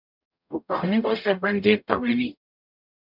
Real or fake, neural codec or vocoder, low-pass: fake; codec, 44.1 kHz, 0.9 kbps, DAC; 5.4 kHz